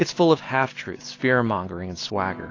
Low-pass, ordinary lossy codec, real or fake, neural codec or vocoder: 7.2 kHz; AAC, 32 kbps; real; none